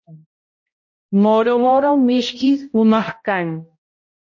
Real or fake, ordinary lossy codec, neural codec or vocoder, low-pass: fake; MP3, 32 kbps; codec, 16 kHz, 0.5 kbps, X-Codec, HuBERT features, trained on balanced general audio; 7.2 kHz